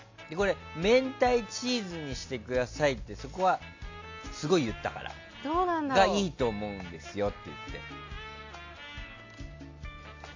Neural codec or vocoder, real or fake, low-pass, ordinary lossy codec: none; real; 7.2 kHz; AAC, 48 kbps